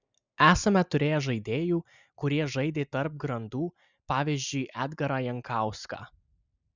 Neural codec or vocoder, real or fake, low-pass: none; real; 7.2 kHz